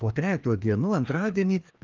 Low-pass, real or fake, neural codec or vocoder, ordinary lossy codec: 7.2 kHz; fake; codec, 16 kHz, 2 kbps, FreqCodec, larger model; Opus, 32 kbps